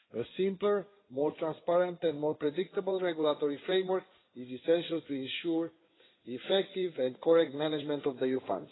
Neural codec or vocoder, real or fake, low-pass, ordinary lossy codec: codec, 16 kHz in and 24 kHz out, 2.2 kbps, FireRedTTS-2 codec; fake; 7.2 kHz; AAC, 16 kbps